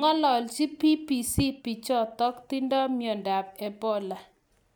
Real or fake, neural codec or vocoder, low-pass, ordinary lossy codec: real; none; none; none